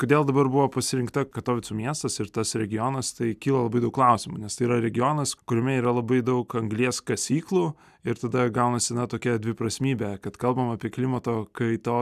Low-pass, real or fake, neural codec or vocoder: 14.4 kHz; real; none